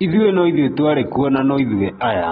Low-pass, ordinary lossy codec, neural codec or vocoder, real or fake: 19.8 kHz; AAC, 16 kbps; none; real